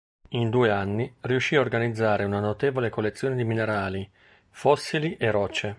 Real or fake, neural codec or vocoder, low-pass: real; none; 9.9 kHz